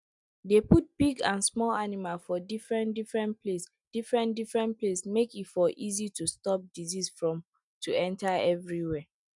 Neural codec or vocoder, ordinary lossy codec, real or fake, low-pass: none; none; real; 10.8 kHz